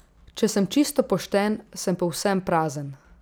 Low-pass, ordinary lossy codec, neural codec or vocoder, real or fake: none; none; none; real